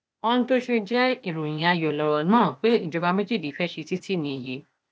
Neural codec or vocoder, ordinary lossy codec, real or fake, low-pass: codec, 16 kHz, 0.8 kbps, ZipCodec; none; fake; none